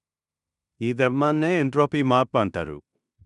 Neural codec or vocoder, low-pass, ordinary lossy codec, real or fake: codec, 16 kHz in and 24 kHz out, 0.9 kbps, LongCat-Audio-Codec, fine tuned four codebook decoder; 10.8 kHz; none; fake